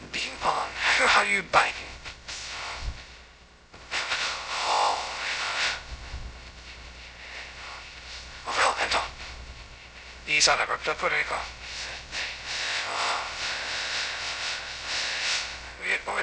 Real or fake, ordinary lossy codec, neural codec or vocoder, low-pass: fake; none; codec, 16 kHz, 0.2 kbps, FocalCodec; none